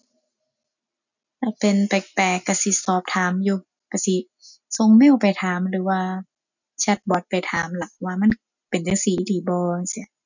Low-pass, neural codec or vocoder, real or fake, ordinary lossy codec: 7.2 kHz; none; real; none